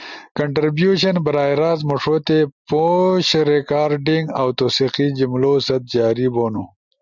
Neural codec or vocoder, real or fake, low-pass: none; real; 7.2 kHz